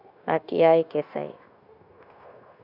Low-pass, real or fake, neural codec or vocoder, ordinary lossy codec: 5.4 kHz; fake; codec, 16 kHz, 0.9 kbps, LongCat-Audio-Codec; none